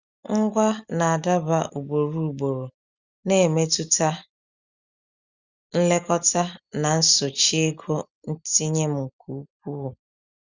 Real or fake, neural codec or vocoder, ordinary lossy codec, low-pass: real; none; Opus, 64 kbps; 7.2 kHz